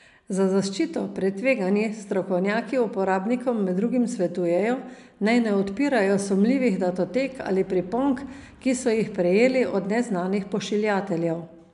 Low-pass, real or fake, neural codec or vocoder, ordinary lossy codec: 10.8 kHz; real; none; none